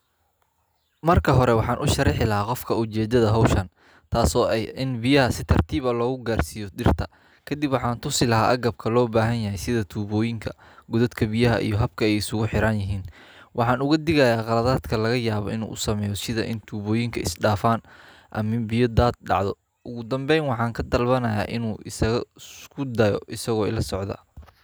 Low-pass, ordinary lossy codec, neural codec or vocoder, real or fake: none; none; none; real